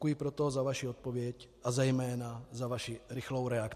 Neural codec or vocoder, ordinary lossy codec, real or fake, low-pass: none; MP3, 64 kbps; real; 14.4 kHz